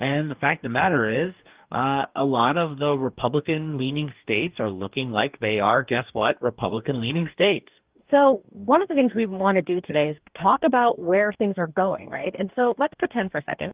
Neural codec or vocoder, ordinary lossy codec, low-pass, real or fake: codec, 44.1 kHz, 2.6 kbps, DAC; Opus, 32 kbps; 3.6 kHz; fake